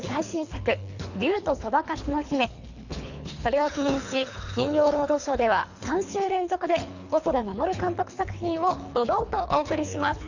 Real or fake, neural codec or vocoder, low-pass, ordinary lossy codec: fake; codec, 24 kHz, 3 kbps, HILCodec; 7.2 kHz; none